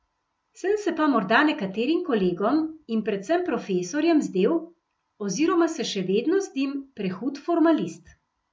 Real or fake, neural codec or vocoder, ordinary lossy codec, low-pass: real; none; none; none